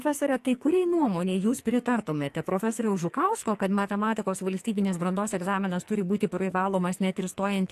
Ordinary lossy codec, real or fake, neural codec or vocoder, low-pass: AAC, 64 kbps; fake; codec, 44.1 kHz, 2.6 kbps, SNAC; 14.4 kHz